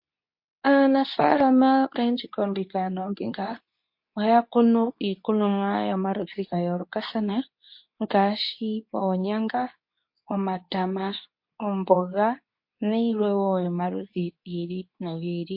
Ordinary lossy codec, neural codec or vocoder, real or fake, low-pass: MP3, 32 kbps; codec, 24 kHz, 0.9 kbps, WavTokenizer, medium speech release version 2; fake; 5.4 kHz